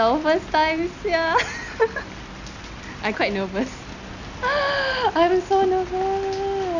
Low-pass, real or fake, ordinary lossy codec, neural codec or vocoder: 7.2 kHz; real; none; none